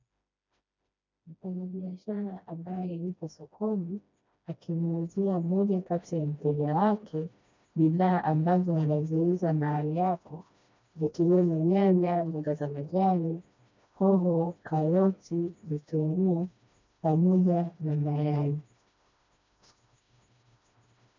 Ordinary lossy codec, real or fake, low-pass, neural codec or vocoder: MP3, 64 kbps; fake; 7.2 kHz; codec, 16 kHz, 1 kbps, FreqCodec, smaller model